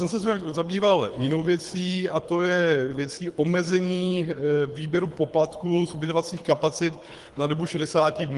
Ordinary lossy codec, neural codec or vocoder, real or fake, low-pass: Opus, 24 kbps; codec, 24 kHz, 3 kbps, HILCodec; fake; 10.8 kHz